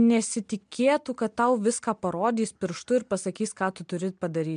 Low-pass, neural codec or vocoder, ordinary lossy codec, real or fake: 9.9 kHz; none; MP3, 64 kbps; real